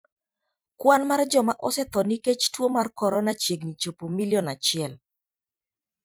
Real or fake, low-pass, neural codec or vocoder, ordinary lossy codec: fake; none; vocoder, 44.1 kHz, 128 mel bands every 256 samples, BigVGAN v2; none